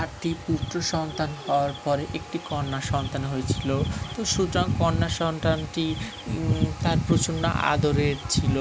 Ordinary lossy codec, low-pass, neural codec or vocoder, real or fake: none; none; none; real